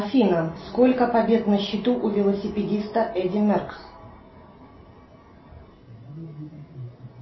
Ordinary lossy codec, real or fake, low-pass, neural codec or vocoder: MP3, 24 kbps; real; 7.2 kHz; none